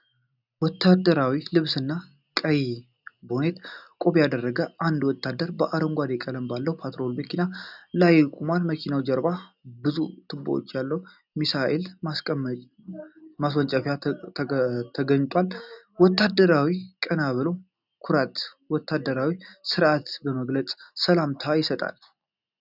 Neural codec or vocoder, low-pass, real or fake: none; 5.4 kHz; real